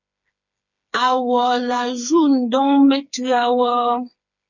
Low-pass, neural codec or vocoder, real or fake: 7.2 kHz; codec, 16 kHz, 4 kbps, FreqCodec, smaller model; fake